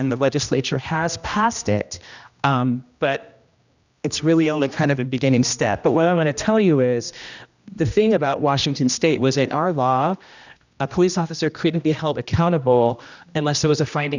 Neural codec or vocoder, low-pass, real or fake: codec, 16 kHz, 1 kbps, X-Codec, HuBERT features, trained on general audio; 7.2 kHz; fake